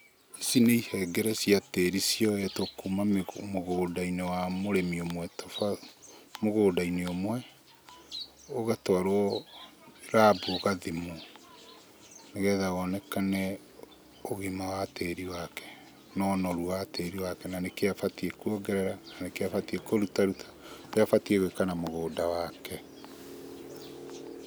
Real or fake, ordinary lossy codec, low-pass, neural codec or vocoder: real; none; none; none